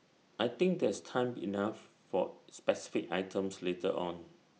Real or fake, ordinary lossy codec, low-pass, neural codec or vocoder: real; none; none; none